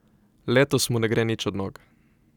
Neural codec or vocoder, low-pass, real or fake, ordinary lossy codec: none; 19.8 kHz; real; none